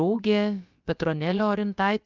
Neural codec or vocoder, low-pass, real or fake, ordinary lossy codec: codec, 16 kHz, about 1 kbps, DyCAST, with the encoder's durations; 7.2 kHz; fake; Opus, 24 kbps